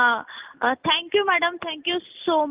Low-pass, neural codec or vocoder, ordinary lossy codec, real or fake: 3.6 kHz; none; Opus, 32 kbps; real